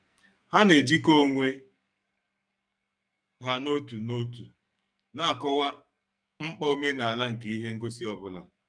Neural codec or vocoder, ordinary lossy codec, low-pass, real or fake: codec, 44.1 kHz, 2.6 kbps, SNAC; none; 9.9 kHz; fake